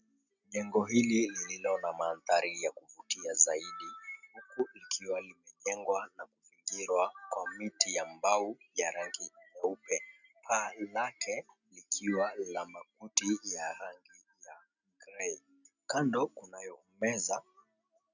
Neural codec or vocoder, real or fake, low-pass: none; real; 7.2 kHz